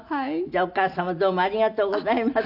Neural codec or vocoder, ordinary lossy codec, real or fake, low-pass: none; none; real; 5.4 kHz